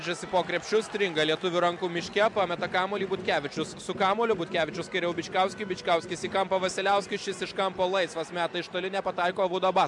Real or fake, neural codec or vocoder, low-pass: real; none; 10.8 kHz